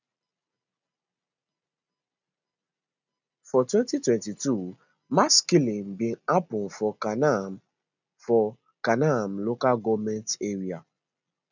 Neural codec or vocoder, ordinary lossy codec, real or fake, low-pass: none; none; real; 7.2 kHz